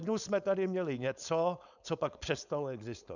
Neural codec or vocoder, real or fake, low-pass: codec, 16 kHz, 4.8 kbps, FACodec; fake; 7.2 kHz